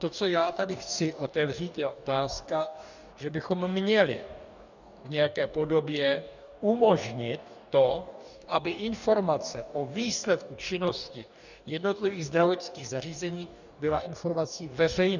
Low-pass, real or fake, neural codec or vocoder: 7.2 kHz; fake; codec, 44.1 kHz, 2.6 kbps, DAC